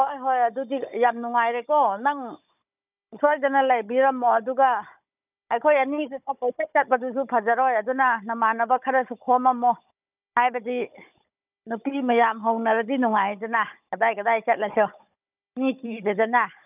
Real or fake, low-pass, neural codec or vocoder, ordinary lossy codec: fake; 3.6 kHz; codec, 16 kHz, 16 kbps, FunCodec, trained on Chinese and English, 50 frames a second; none